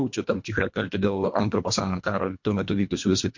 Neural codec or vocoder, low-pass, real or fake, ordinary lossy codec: codec, 24 kHz, 1.5 kbps, HILCodec; 7.2 kHz; fake; MP3, 48 kbps